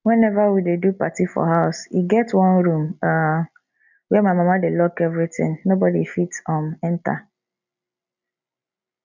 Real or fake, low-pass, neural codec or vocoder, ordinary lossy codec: real; 7.2 kHz; none; none